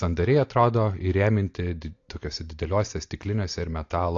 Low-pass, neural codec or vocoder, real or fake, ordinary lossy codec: 7.2 kHz; none; real; AAC, 48 kbps